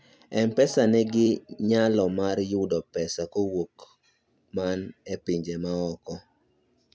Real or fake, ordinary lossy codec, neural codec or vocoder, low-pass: real; none; none; none